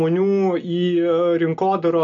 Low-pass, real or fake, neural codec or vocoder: 7.2 kHz; real; none